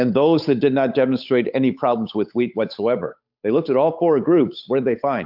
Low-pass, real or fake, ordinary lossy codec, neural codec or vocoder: 5.4 kHz; fake; AAC, 48 kbps; codec, 16 kHz, 8 kbps, FunCodec, trained on Chinese and English, 25 frames a second